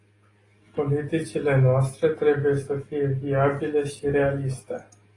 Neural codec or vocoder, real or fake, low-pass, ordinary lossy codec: none; real; 10.8 kHz; AAC, 32 kbps